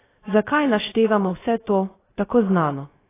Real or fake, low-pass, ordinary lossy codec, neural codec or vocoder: fake; 3.6 kHz; AAC, 16 kbps; codec, 16 kHz, 0.7 kbps, FocalCodec